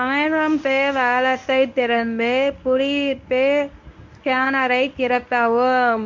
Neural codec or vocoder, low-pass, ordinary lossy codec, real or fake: codec, 24 kHz, 0.9 kbps, WavTokenizer, medium speech release version 1; 7.2 kHz; MP3, 64 kbps; fake